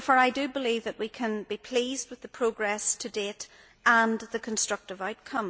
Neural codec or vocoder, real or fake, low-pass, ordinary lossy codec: none; real; none; none